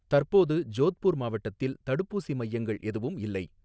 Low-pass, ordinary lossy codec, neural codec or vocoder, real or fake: none; none; none; real